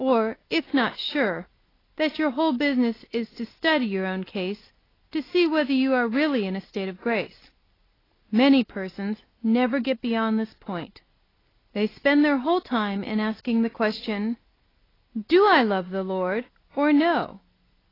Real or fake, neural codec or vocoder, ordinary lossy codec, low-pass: real; none; AAC, 24 kbps; 5.4 kHz